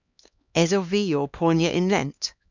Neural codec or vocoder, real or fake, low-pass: codec, 16 kHz, 2 kbps, X-Codec, HuBERT features, trained on LibriSpeech; fake; 7.2 kHz